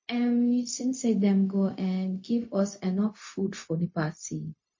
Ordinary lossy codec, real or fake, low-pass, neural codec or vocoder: MP3, 32 kbps; fake; 7.2 kHz; codec, 16 kHz, 0.4 kbps, LongCat-Audio-Codec